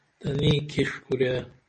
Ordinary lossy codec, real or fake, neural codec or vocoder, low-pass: MP3, 32 kbps; real; none; 9.9 kHz